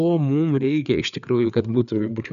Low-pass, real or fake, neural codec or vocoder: 7.2 kHz; fake; codec, 16 kHz, 4 kbps, FreqCodec, larger model